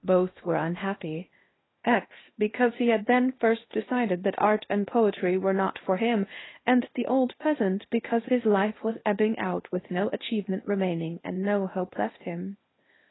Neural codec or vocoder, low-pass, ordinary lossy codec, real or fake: codec, 16 kHz in and 24 kHz out, 0.8 kbps, FocalCodec, streaming, 65536 codes; 7.2 kHz; AAC, 16 kbps; fake